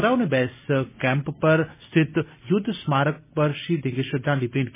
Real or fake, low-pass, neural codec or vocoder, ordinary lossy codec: real; 3.6 kHz; none; MP3, 16 kbps